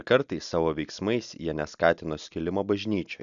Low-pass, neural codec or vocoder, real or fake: 7.2 kHz; none; real